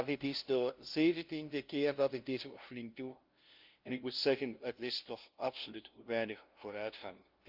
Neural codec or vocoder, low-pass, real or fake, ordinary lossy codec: codec, 16 kHz, 0.5 kbps, FunCodec, trained on LibriTTS, 25 frames a second; 5.4 kHz; fake; Opus, 32 kbps